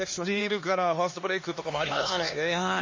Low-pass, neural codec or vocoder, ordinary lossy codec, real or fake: 7.2 kHz; codec, 16 kHz, 2 kbps, X-Codec, HuBERT features, trained on LibriSpeech; MP3, 32 kbps; fake